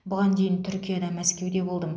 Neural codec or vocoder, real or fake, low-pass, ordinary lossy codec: none; real; none; none